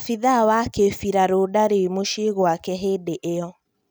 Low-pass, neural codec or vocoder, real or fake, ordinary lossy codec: none; none; real; none